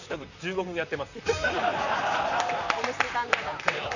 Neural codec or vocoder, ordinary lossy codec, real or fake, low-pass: vocoder, 44.1 kHz, 128 mel bands, Pupu-Vocoder; none; fake; 7.2 kHz